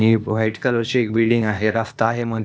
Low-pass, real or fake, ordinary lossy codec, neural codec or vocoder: none; fake; none; codec, 16 kHz, 0.8 kbps, ZipCodec